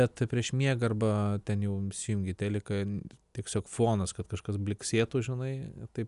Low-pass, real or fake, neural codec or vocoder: 10.8 kHz; real; none